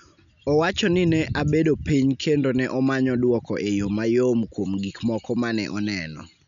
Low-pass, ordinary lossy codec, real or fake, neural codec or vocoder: 7.2 kHz; none; real; none